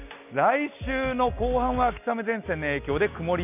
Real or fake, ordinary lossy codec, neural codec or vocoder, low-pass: real; none; none; 3.6 kHz